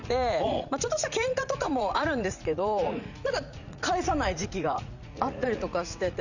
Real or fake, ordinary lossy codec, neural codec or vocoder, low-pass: fake; none; vocoder, 44.1 kHz, 80 mel bands, Vocos; 7.2 kHz